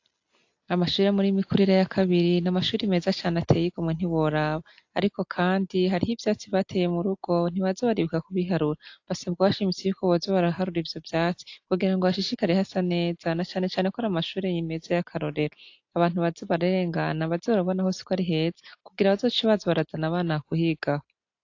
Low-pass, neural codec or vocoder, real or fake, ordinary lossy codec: 7.2 kHz; none; real; AAC, 48 kbps